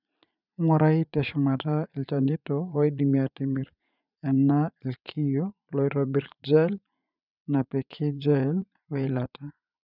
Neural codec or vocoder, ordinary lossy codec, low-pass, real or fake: vocoder, 44.1 kHz, 80 mel bands, Vocos; none; 5.4 kHz; fake